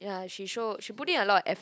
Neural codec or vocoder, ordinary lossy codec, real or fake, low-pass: none; none; real; none